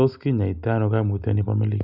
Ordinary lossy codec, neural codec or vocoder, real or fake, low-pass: none; none; real; 5.4 kHz